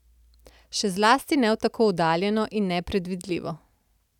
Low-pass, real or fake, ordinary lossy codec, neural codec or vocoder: 19.8 kHz; real; none; none